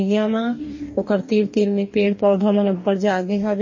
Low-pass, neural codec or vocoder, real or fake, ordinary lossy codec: 7.2 kHz; codec, 44.1 kHz, 2.6 kbps, DAC; fake; MP3, 32 kbps